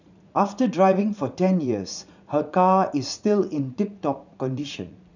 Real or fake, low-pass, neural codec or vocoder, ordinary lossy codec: fake; 7.2 kHz; vocoder, 44.1 kHz, 80 mel bands, Vocos; none